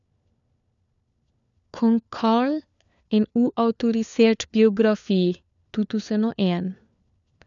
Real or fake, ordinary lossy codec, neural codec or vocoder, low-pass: fake; none; codec, 16 kHz, 4 kbps, FunCodec, trained on LibriTTS, 50 frames a second; 7.2 kHz